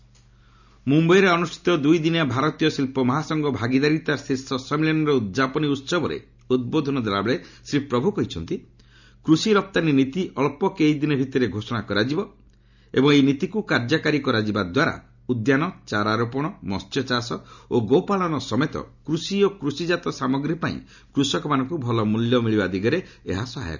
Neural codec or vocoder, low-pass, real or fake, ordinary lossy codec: none; 7.2 kHz; real; none